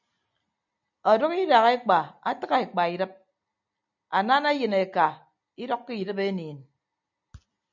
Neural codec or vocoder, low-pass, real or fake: none; 7.2 kHz; real